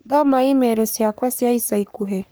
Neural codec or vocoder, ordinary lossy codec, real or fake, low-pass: codec, 44.1 kHz, 3.4 kbps, Pupu-Codec; none; fake; none